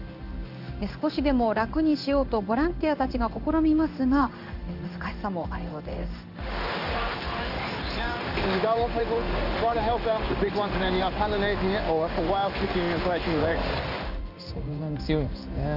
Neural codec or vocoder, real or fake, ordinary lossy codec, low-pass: codec, 16 kHz in and 24 kHz out, 1 kbps, XY-Tokenizer; fake; AAC, 48 kbps; 5.4 kHz